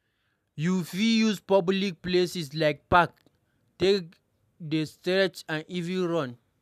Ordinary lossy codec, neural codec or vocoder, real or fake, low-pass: none; none; real; 14.4 kHz